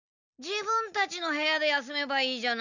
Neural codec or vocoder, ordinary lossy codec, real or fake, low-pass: none; none; real; 7.2 kHz